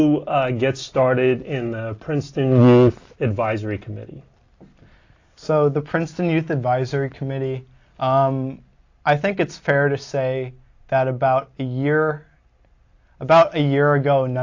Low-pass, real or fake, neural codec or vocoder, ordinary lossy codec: 7.2 kHz; real; none; AAC, 48 kbps